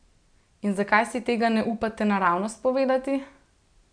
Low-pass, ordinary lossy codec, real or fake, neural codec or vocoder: 9.9 kHz; none; real; none